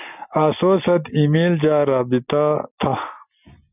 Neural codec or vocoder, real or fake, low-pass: none; real; 3.6 kHz